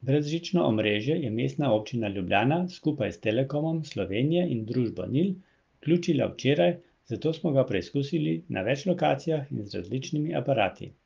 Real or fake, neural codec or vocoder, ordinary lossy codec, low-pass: real; none; Opus, 32 kbps; 7.2 kHz